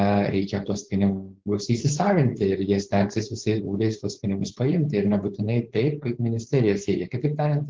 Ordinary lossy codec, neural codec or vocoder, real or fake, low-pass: Opus, 16 kbps; codec, 16 kHz, 4.8 kbps, FACodec; fake; 7.2 kHz